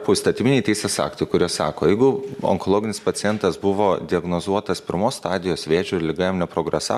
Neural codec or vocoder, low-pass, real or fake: none; 14.4 kHz; real